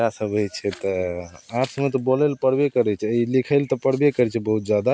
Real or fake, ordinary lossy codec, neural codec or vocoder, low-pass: real; none; none; none